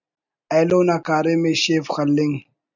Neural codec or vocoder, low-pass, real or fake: none; 7.2 kHz; real